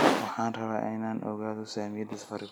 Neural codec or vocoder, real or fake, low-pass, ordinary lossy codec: none; real; none; none